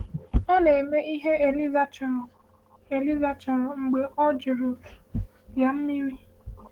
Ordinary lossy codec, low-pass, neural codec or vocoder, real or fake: Opus, 16 kbps; 19.8 kHz; codec, 44.1 kHz, 7.8 kbps, DAC; fake